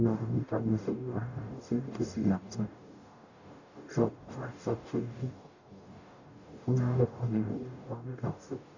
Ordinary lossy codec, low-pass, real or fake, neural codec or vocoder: none; 7.2 kHz; fake; codec, 44.1 kHz, 0.9 kbps, DAC